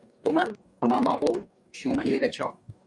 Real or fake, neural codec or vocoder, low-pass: fake; codec, 44.1 kHz, 2.6 kbps, DAC; 10.8 kHz